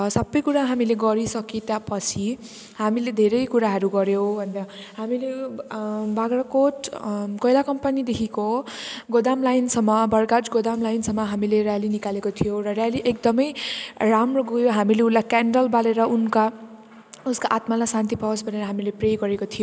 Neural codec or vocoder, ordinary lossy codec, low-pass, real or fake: none; none; none; real